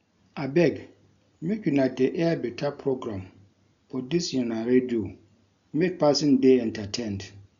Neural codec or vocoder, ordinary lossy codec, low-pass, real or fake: none; none; 7.2 kHz; real